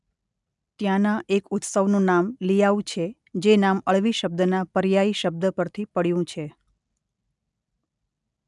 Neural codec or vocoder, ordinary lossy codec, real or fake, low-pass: none; none; real; 10.8 kHz